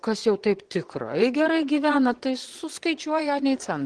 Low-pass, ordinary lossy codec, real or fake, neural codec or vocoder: 9.9 kHz; Opus, 16 kbps; fake; vocoder, 22.05 kHz, 80 mel bands, Vocos